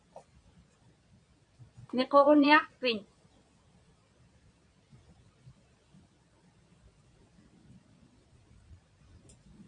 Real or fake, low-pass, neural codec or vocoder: fake; 9.9 kHz; vocoder, 22.05 kHz, 80 mel bands, Vocos